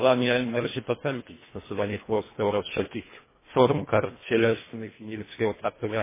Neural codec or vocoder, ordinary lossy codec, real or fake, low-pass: codec, 24 kHz, 1.5 kbps, HILCodec; MP3, 16 kbps; fake; 3.6 kHz